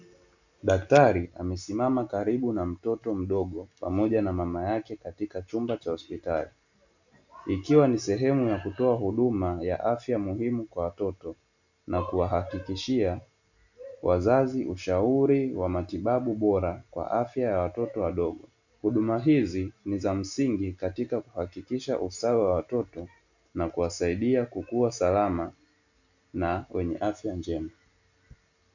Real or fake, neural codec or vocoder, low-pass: real; none; 7.2 kHz